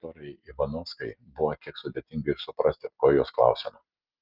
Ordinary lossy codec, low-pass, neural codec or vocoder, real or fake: Opus, 32 kbps; 5.4 kHz; none; real